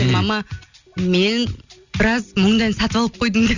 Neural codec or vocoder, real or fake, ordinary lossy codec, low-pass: none; real; none; 7.2 kHz